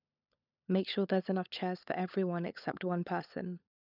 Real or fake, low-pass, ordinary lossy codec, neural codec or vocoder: fake; 5.4 kHz; none; codec, 16 kHz, 16 kbps, FunCodec, trained on LibriTTS, 50 frames a second